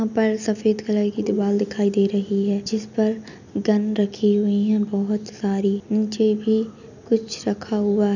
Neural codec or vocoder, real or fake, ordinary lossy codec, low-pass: none; real; none; 7.2 kHz